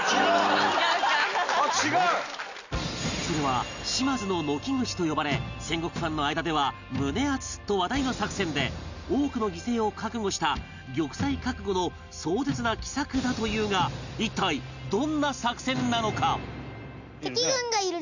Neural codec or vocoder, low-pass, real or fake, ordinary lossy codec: none; 7.2 kHz; real; none